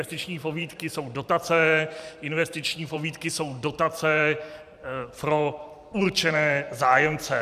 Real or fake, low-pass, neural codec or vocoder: fake; 14.4 kHz; vocoder, 44.1 kHz, 128 mel bands every 512 samples, BigVGAN v2